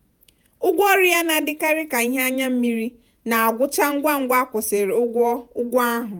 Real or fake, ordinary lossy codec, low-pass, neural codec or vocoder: fake; none; none; vocoder, 48 kHz, 128 mel bands, Vocos